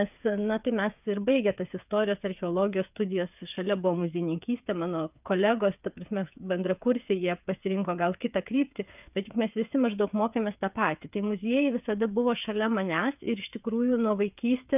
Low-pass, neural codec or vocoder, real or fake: 3.6 kHz; codec, 16 kHz, 8 kbps, FreqCodec, smaller model; fake